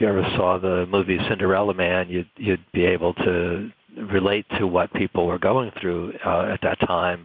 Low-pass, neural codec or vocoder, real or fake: 5.4 kHz; none; real